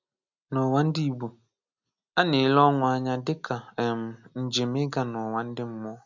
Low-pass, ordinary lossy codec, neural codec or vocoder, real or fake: 7.2 kHz; none; none; real